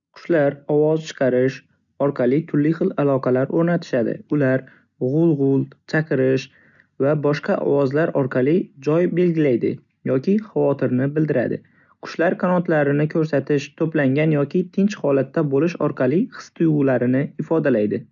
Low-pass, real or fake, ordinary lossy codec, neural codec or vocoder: 7.2 kHz; real; none; none